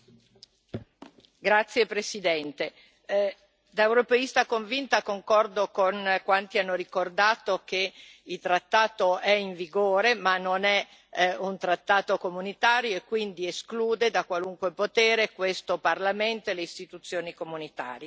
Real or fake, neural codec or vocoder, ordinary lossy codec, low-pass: real; none; none; none